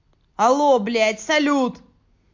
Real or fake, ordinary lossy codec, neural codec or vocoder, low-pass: real; MP3, 48 kbps; none; 7.2 kHz